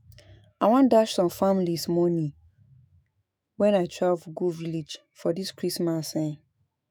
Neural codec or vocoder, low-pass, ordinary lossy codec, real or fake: autoencoder, 48 kHz, 128 numbers a frame, DAC-VAE, trained on Japanese speech; none; none; fake